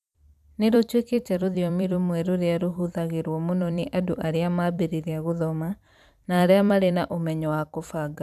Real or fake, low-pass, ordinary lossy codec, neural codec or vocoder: fake; 14.4 kHz; none; vocoder, 44.1 kHz, 128 mel bands every 256 samples, BigVGAN v2